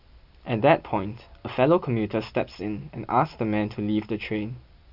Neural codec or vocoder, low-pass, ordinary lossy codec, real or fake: none; 5.4 kHz; none; real